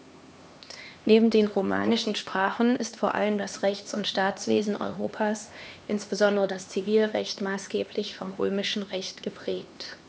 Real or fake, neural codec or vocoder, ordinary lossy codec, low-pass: fake; codec, 16 kHz, 2 kbps, X-Codec, HuBERT features, trained on LibriSpeech; none; none